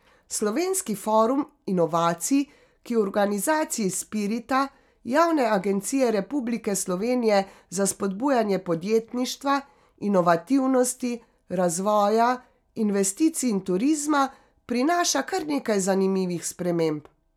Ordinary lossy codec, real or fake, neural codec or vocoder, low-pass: none; real; none; 19.8 kHz